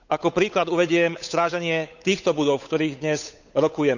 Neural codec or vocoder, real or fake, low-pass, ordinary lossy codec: codec, 16 kHz, 8 kbps, FunCodec, trained on Chinese and English, 25 frames a second; fake; 7.2 kHz; none